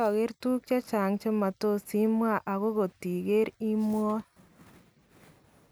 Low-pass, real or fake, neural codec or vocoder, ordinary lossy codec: none; real; none; none